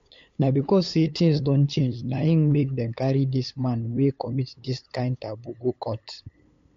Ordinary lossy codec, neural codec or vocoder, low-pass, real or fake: AAC, 48 kbps; codec, 16 kHz, 8 kbps, FunCodec, trained on LibriTTS, 25 frames a second; 7.2 kHz; fake